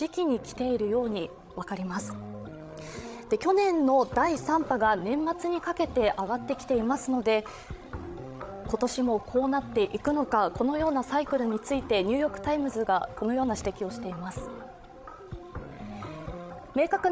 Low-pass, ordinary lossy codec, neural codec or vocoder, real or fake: none; none; codec, 16 kHz, 16 kbps, FreqCodec, larger model; fake